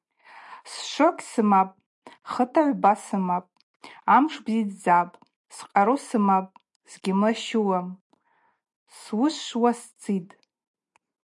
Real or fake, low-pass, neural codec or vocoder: real; 10.8 kHz; none